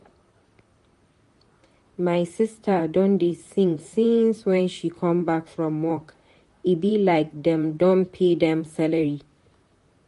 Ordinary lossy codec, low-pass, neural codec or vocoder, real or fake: MP3, 48 kbps; 14.4 kHz; vocoder, 44.1 kHz, 128 mel bands, Pupu-Vocoder; fake